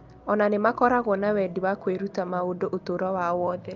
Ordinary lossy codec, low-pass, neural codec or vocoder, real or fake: Opus, 24 kbps; 7.2 kHz; none; real